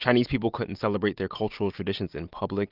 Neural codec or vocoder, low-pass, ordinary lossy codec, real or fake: none; 5.4 kHz; Opus, 32 kbps; real